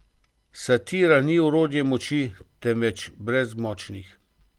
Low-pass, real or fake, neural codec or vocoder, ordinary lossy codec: 19.8 kHz; real; none; Opus, 24 kbps